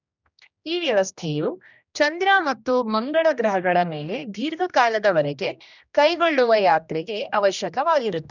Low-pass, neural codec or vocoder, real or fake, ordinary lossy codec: 7.2 kHz; codec, 16 kHz, 1 kbps, X-Codec, HuBERT features, trained on general audio; fake; none